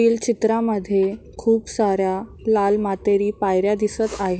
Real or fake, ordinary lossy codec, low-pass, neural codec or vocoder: real; none; none; none